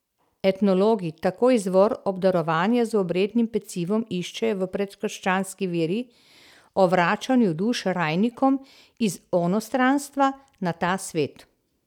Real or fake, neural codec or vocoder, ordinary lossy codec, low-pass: real; none; none; 19.8 kHz